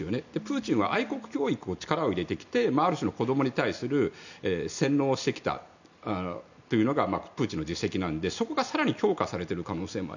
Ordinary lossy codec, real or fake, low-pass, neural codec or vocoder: none; real; 7.2 kHz; none